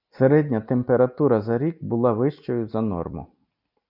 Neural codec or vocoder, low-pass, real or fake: none; 5.4 kHz; real